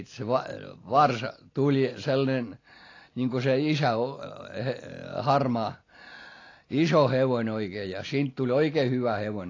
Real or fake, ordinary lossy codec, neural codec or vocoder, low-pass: real; AAC, 32 kbps; none; 7.2 kHz